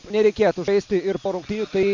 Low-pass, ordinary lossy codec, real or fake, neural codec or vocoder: 7.2 kHz; MP3, 64 kbps; real; none